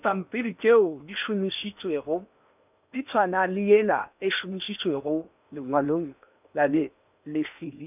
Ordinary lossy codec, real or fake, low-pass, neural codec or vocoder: none; fake; 3.6 kHz; codec, 16 kHz in and 24 kHz out, 0.8 kbps, FocalCodec, streaming, 65536 codes